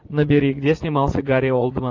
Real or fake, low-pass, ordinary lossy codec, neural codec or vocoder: fake; 7.2 kHz; AAC, 48 kbps; vocoder, 44.1 kHz, 80 mel bands, Vocos